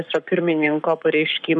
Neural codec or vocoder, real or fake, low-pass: codec, 44.1 kHz, 7.8 kbps, Pupu-Codec; fake; 10.8 kHz